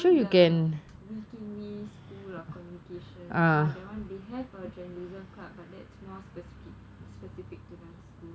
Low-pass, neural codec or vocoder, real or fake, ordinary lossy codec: none; none; real; none